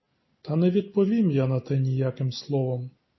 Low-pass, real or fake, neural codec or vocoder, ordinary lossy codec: 7.2 kHz; real; none; MP3, 24 kbps